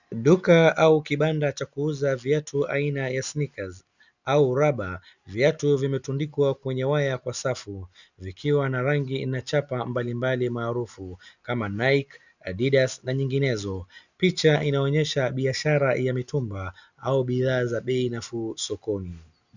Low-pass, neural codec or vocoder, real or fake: 7.2 kHz; none; real